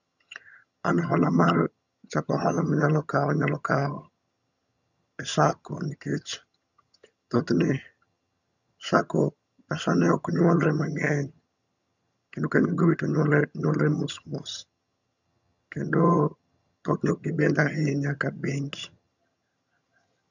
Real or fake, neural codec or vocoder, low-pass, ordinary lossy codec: fake; vocoder, 22.05 kHz, 80 mel bands, HiFi-GAN; 7.2 kHz; none